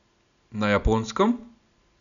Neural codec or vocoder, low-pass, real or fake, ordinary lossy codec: none; 7.2 kHz; real; none